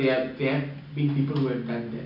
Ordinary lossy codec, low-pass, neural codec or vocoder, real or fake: none; 5.4 kHz; none; real